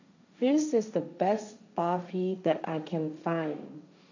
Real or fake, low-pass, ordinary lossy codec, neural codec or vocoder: fake; 7.2 kHz; none; codec, 16 kHz, 1.1 kbps, Voila-Tokenizer